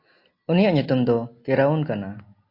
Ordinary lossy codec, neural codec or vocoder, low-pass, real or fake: AAC, 32 kbps; none; 5.4 kHz; real